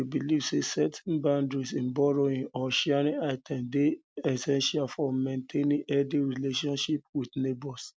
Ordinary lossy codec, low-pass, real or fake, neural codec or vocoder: none; none; real; none